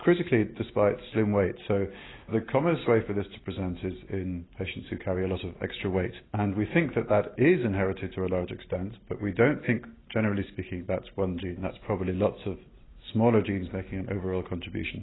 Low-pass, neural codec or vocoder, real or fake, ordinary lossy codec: 7.2 kHz; none; real; AAC, 16 kbps